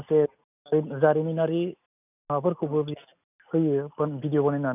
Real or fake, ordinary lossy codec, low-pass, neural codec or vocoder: real; none; 3.6 kHz; none